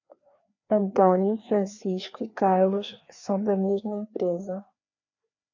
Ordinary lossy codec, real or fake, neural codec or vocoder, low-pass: AAC, 48 kbps; fake; codec, 16 kHz, 2 kbps, FreqCodec, larger model; 7.2 kHz